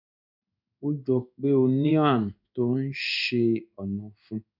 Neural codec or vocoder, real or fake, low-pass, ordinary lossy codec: codec, 16 kHz in and 24 kHz out, 1 kbps, XY-Tokenizer; fake; 5.4 kHz; none